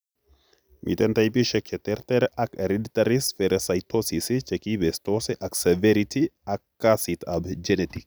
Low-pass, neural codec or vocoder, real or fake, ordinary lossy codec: none; none; real; none